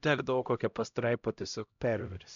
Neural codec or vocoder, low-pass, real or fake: codec, 16 kHz, 0.5 kbps, X-Codec, HuBERT features, trained on LibriSpeech; 7.2 kHz; fake